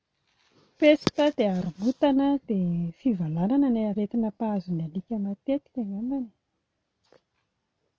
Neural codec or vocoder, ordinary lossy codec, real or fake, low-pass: none; none; real; none